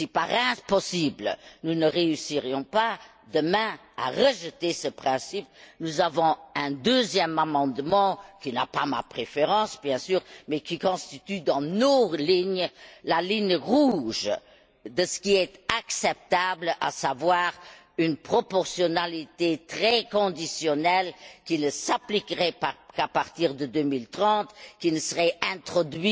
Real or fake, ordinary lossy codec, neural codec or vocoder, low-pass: real; none; none; none